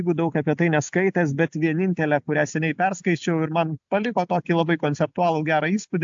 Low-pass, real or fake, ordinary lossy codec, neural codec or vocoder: 7.2 kHz; real; AAC, 64 kbps; none